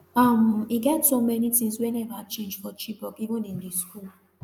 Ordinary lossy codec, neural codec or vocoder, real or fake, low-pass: none; none; real; 19.8 kHz